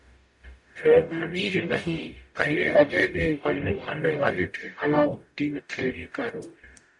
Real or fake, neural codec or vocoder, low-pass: fake; codec, 44.1 kHz, 0.9 kbps, DAC; 10.8 kHz